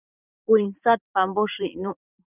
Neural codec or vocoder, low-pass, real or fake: vocoder, 44.1 kHz, 128 mel bands every 512 samples, BigVGAN v2; 3.6 kHz; fake